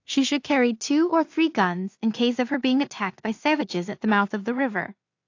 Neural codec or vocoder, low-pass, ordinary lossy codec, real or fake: codec, 16 kHz in and 24 kHz out, 0.4 kbps, LongCat-Audio-Codec, two codebook decoder; 7.2 kHz; AAC, 48 kbps; fake